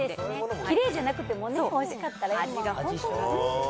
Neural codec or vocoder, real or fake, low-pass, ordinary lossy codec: none; real; none; none